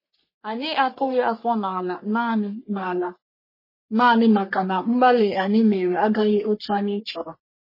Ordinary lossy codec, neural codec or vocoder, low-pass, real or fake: MP3, 24 kbps; codec, 44.1 kHz, 3.4 kbps, Pupu-Codec; 5.4 kHz; fake